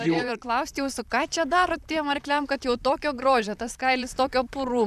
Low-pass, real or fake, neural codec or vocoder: 14.4 kHz; fake; vocoder, 44.1 kHz, 128 mel bands, Pupu-Vocoder